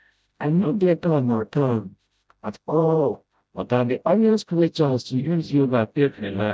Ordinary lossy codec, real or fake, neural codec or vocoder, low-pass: none; fake; codec, 16 kHz, 0.5 kbps, FreqCodec, smaller model; none